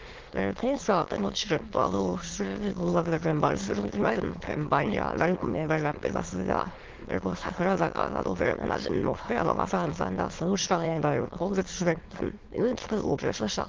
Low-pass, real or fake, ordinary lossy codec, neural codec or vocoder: 7.2 kHz; fake; Opus, 16 kbps; autoencoder, 22.05 kHz, a latent of 192 numbers a frame, VITS, trained on many speakers